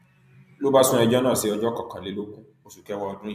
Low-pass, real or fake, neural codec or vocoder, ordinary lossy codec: 14.4 kHz; fake; vocoder, 44.1 kHz, 128 mel bands every 256 samples, BigVGAN v2; none